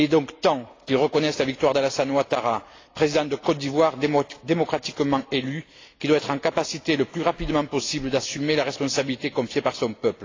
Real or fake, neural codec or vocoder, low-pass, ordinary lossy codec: real; none; 7.2 kHz; AAC, 32 kbps